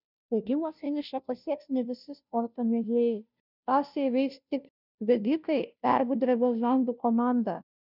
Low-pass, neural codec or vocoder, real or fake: 5.4 kHz; codec, 16 kHz, 0.5 kbps, FunCodec, trained on Chinese and English, 25 frames a second; fake